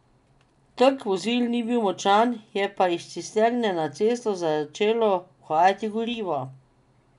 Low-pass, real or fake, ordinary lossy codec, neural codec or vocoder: 10.8 kHz; real; none; none